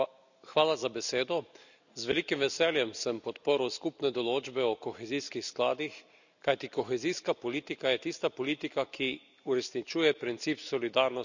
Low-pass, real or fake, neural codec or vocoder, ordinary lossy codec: 7.2 kHz; real; none; none